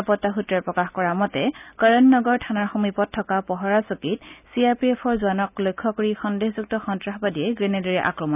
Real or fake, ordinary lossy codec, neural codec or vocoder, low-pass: real; none; none; 3.6 kHz